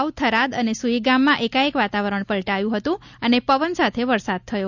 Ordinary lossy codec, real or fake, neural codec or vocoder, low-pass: none; real; none; 7.2 kHz